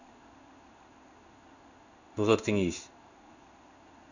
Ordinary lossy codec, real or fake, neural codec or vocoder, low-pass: none; fake; codec, 16 kHz in and 24 kHz out, 1 kbps, XY-Tokenizer; 7.2 kHz